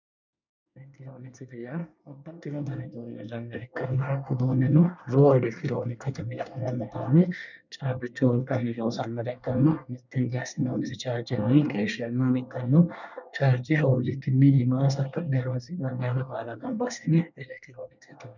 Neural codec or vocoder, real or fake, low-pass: codec, 24 kHz, 1 kbps, SNAC; fake; 7.2 kHz